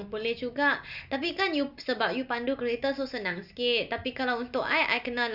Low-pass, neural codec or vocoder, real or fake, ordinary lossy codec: 5.4 kHz; none; real; none